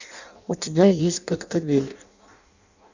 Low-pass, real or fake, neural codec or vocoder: 7.2 kHz; fake; codec, 16 kHz in and 24 kHz out, 0.6 kbps, FireRedTTS-2 codec